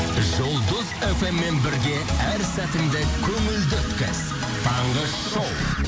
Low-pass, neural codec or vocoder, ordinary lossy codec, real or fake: none; none; none; real